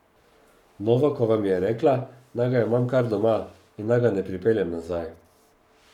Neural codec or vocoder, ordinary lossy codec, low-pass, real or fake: codec, 44.1 kHz, 7.8 kbps, Pupu-Codec; none; 19.8 kHz; fake